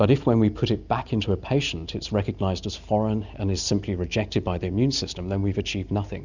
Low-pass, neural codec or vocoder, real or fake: 7.2 kHz; none; real